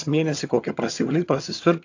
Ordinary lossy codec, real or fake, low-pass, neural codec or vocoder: AAC, 48 kbps; fake; 7.2 kHz; vocoder, 22.05 kHz, 80 mel bands, HiFi-GAN